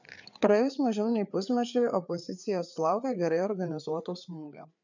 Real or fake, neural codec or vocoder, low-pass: fake; codec, 16 kHz, 4 kbps, FreqCodec, larger model; 7.2 kHz